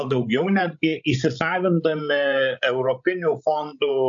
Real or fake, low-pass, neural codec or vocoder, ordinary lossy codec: fake; 7.2 kHz; codec, 16 kHz, 16 kbps, FreqCodec, larger model; AAC, 64 kbps